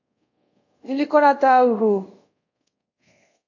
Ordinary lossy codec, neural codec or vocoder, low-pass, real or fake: AAC, 48 kbps; codec, 24 kHz, 0.5 kbps, DualCodec; 7.2 kHz; fake